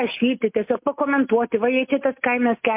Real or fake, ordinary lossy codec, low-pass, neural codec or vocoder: real; MP3, 32 kbps; 3.6 kHz; none